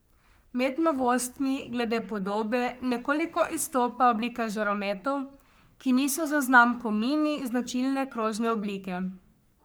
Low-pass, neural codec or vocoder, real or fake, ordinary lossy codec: none; codec, 44.1 kHz, 3.4 kbps, Pupu-Codec; fake; none